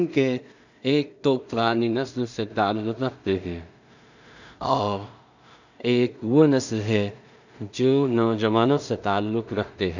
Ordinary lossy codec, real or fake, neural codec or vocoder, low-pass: none; fake; codec, 16 kHz in and 24 kHz out, 0.4 kbps, LongCat-Audio-Codec, two codebook decoder; 7.2 kHz